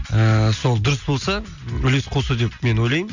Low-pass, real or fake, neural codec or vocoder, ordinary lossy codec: 7.2 kHz; real; none; none